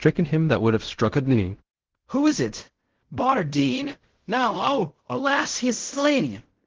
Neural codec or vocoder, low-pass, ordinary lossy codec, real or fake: codec, 16 kHz in and 24 kHz out, 0.4 kbps, LongCat-Audio-Codec, fine tuned four codebook decoder; 7.2 kHz; Opus, 16 kbps; fake